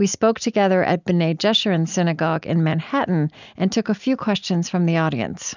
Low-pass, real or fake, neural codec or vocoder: 7.2 kHz; real; none